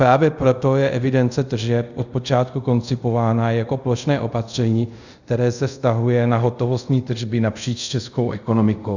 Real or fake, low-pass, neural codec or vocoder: fake; 7.2 kHz; codec, 24 kHz, 0.5 kbps, DualCodec